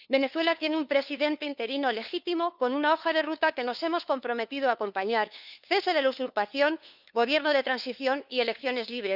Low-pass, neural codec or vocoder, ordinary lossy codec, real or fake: 5.4 kHz; codec, 16 kHz, 2 kbps, FunCodec, trained on LibriTTS, 25 frames a second; none; fake